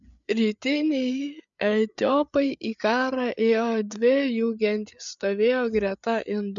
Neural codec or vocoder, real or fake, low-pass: codec, 16 kHz, 8 kbps, FreqCodec, larger model; fake; 7.2 kHz